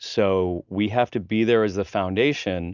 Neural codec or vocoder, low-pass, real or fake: none; 7.2 kHz; real